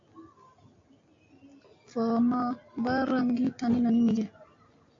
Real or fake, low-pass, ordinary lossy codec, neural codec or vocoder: real; 7.2 kHz; AAC, 48 kbps; none